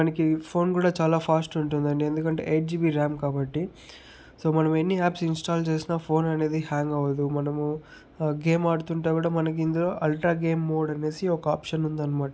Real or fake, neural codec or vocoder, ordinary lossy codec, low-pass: real; none; none; none